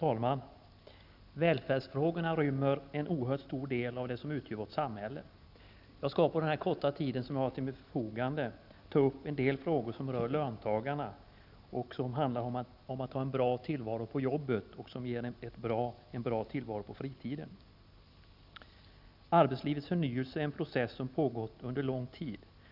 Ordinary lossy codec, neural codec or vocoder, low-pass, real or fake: none; none; 5.4 kHz; real